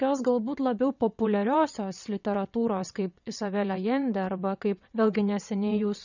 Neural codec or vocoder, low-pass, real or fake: vocoder, 22.05 kHz, 80 mel bands, WaveNeXt; 7.2 kHz; fake